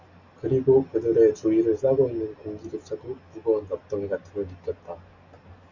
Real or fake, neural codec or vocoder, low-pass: real; none; 7.2 kHz